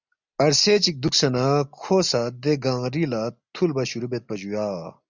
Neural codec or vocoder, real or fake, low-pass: none; real; 7.2 kHz